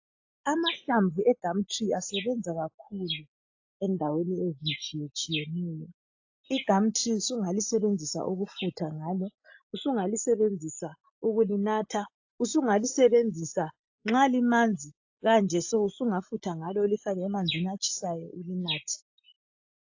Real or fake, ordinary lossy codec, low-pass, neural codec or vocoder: real; AAC, 48 kbps; 7.2 kHz; none